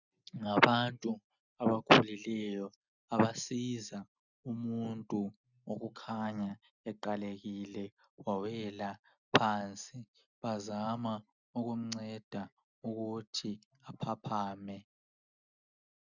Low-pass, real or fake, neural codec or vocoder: 7.2 kHz; real; none